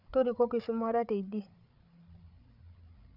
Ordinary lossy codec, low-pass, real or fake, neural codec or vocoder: none; 5.4 kHz; fake; codec, 16 kHz, 8 kbps, FreqCodec, larger model